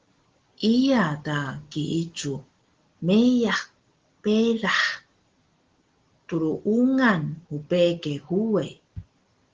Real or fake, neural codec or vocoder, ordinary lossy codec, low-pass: real; none; Opus, 16 kbps; 7.2 kHz